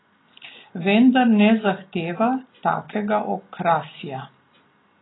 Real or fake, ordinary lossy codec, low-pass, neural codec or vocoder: real; AAC, 16 kbps; 7.2 kHz; none